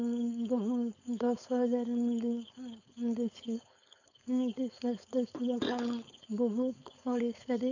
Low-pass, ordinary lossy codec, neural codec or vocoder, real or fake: 7.2 kHz; none; codec, 16 kHz, 4.8 kbps, FACodec; fake